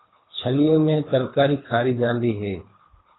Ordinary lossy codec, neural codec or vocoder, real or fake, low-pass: AAC, 16 kbps; codec, 24 kHz, 3 kbps, HILCodec; fake; 7.2 kHz